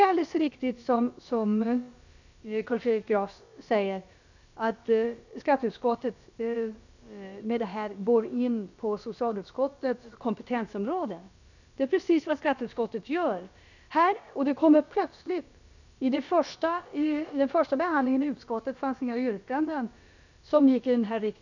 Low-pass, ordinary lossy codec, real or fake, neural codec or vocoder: 7.2 kHz; none; fake; codec, 16 kHz, about 1 kbps, DyCAST, with the encoder's durations